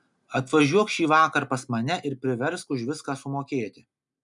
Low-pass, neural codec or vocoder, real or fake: 10.8 kHz; none; real